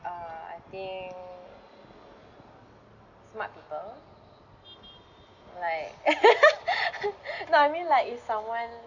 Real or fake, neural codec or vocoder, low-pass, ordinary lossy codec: real; none; 7.2 kHz; none